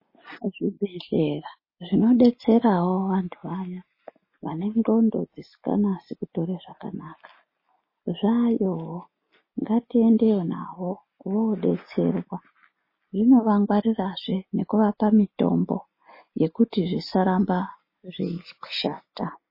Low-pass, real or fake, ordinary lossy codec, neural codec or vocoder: 5.4 kHz; real; MP3, 24 kbps; none